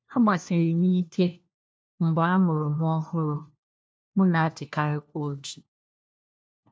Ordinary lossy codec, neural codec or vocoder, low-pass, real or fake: none; codec, 16 kHz, 1 kbps, FunCodec, trained on LibriTTS, 50 frames a second; none; fake